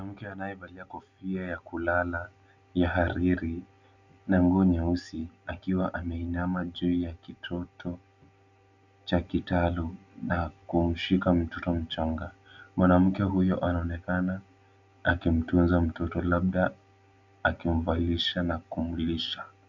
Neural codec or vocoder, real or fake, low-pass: none; real; 7.2 kHz